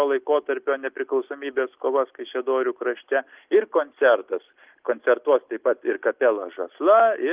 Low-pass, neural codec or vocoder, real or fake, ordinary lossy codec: 3.6 kHz; none; real; Opus, 32 kbps